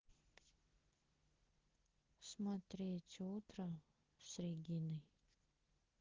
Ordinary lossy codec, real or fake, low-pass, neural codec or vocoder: Opus, 16 kbps; real; 7.2 kHz; none